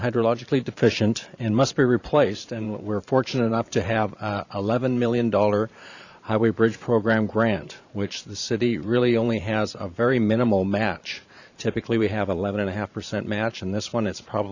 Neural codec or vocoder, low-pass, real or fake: vocoder, 44.1 kHz, 128 mel bands every 512 samples, BigVGAN v2; 7.2 kHz; fake